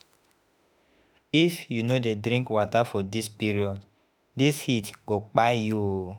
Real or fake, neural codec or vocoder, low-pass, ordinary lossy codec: fake; autoencoder, 48 kHz, 32 numbers a frame, DAC-VAE, trained on Japanese speech; none; none